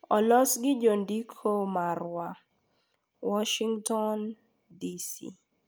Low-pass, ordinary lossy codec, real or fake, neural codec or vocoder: none; none; real; none